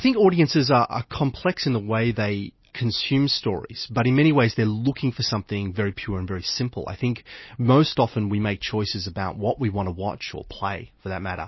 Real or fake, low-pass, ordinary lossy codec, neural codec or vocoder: real; 7.2 kHz; MP3, 24 kbps; none